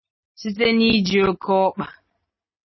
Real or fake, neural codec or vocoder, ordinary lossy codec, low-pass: real; none; MP3, 24 kbps; 7.2 kHz